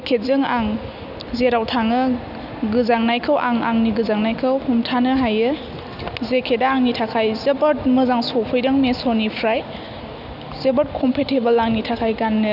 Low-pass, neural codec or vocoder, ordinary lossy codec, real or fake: 5.4 kHz; none; none; real